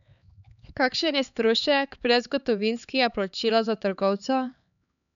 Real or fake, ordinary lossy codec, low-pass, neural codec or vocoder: fake; none; 7.2 kHz; codec, 16 kHz, 4 kbps, X-Codec, HuBERT features, trained on LibriSpeech